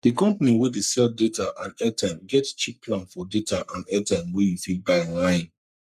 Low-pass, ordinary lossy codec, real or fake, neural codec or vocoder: 14.4 kHz; none; fake; codec, 44.1 kHz, 3.4 kbps, Pupu-Codec